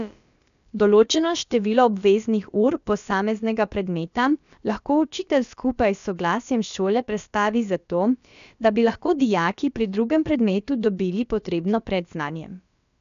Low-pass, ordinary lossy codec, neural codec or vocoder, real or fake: 7.2 kHz; none; codec, 16 kHz, about 1 kbps, DyCAST, with the encoder's durations; fake